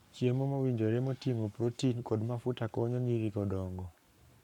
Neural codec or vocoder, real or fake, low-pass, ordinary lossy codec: codec, 44.1 kHz, 7.8 kbps, Pupu-Codec; fake; 19.8 kHz; none